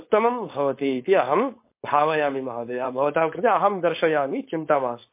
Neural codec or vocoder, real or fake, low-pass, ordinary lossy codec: codec, 16 kHz, 4.8 kbps, FACodec; fake; 3.6 kHz; MP3, 24 kbps